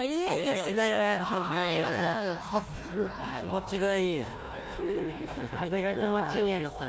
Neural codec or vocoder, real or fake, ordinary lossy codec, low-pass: codec, 16 kHz, 1 kbps, FunCodec, trained on Chinese and English, 50 frames a second; fake; none; none